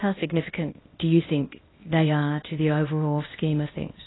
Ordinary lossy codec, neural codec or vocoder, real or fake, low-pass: AAC, 16 kbps; codec, 16 kHz, 0.8 kbps, ZipCodec; fake; 7.2 kHz